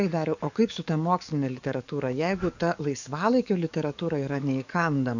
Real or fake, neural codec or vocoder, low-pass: fake; codec, 44.1 kHz, 7.8 kbps, DAC; 7.2 kHz